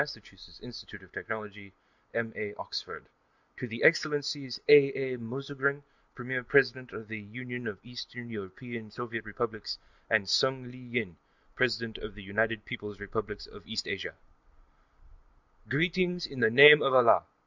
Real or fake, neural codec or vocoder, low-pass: real; none; 7.2 kHz